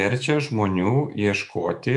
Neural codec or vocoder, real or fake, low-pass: autoencoder, 48 kHz, 128 numbers a frame, DAC-VAE, trained on Japanese speech; fake; 10.8 kHz